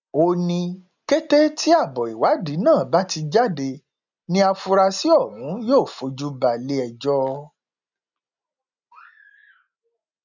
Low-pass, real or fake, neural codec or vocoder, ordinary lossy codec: 7.2 kHz; real; none; none